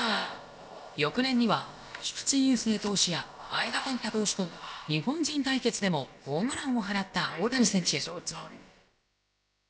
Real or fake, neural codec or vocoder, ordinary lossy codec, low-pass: fake; codec, 16 kHz, about 1 kbps, DyCAST, with the encoder's durations; none; none